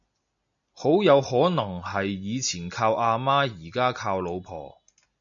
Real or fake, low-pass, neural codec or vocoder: real; 7.2 kHz; none